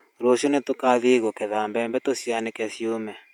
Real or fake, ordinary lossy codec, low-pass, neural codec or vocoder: fake; none; 19.8 kHz; vocoder, 48 kHz, 128 mel bands, Vocos